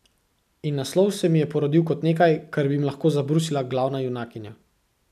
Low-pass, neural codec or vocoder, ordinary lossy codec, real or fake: 14.4 kHz; none; none; real